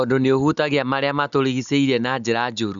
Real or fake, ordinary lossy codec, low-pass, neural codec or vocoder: real; none; 7.2 kHz; none